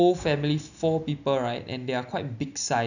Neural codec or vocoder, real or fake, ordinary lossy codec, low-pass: none; real; none; 7.2 kHz